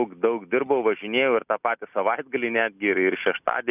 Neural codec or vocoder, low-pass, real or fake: none; 3.6 kHz; real